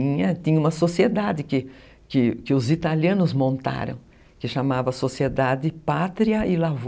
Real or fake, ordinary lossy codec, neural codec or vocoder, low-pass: real; none; none; none